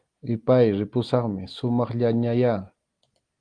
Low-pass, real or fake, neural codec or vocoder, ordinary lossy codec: 9.9 kHz; real; none; Opus, 24 kbps